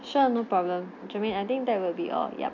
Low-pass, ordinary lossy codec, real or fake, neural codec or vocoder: 7.2 kHz; none; real; none